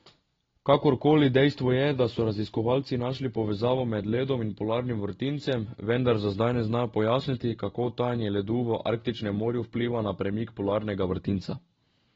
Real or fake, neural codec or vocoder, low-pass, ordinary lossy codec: real; none; 7.2 kHz; AAC, 24 kbps